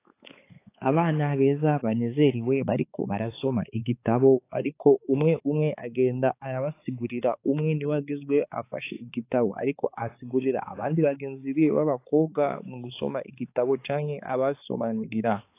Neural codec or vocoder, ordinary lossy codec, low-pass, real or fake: codec, 16 kHz, 4 kbps, X-Codec, HuBERT features, trained on balanced general audio; AAC, 24 kbps; 3.6 kHz; fake